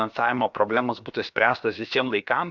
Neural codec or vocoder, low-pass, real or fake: codec, 16 kHz, about 1 kbps, DyCAST, with the encoder's durations; 7.2 kHz; fake